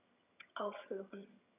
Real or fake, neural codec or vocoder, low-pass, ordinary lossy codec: fake; vocoder, 22.05 kHz, 80 mel bands, HiFi-GAN; 3.6 kHz; none